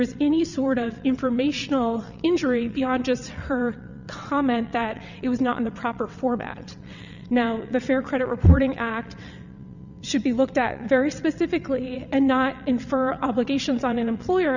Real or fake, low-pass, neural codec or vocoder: fake; 7.2 kHz; vocoder, 22.05 kHz, 80 mel bands, WaveNeXt